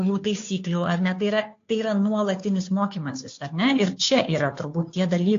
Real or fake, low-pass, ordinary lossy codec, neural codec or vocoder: fake; 7.2 kHz; AAC, 48 kbps; codec, 16 kHz, 2 kbps, FunCodec, trained on Chinese and English, 25 frames a second